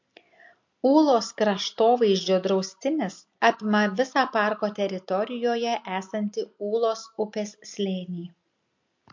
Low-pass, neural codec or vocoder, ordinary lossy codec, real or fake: 7.2 kHz; none; MP3, 48 kbps; real